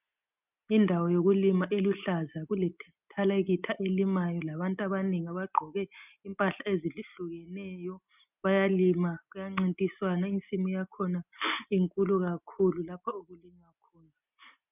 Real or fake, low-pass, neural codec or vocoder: real; 3.6 kHz; none